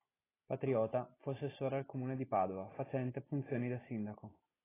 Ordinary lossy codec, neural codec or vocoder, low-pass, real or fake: AAC, 16 kbps; none; 3.6 kHz; real